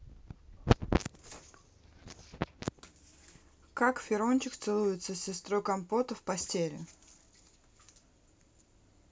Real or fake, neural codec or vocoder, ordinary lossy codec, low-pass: real; none; none; none